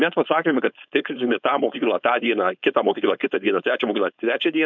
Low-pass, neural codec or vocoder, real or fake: 7.2 kHz; codec, 16 kHz, 4.8 kbps, FACodec; fake